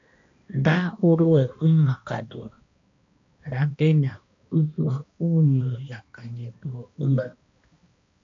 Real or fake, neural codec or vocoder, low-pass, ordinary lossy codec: fake; codec, 16 kHz, 1 kbps, X-Codec, HuBERT features, trained on balanced general audio; 7.2 kHz; AAC, 48 kbps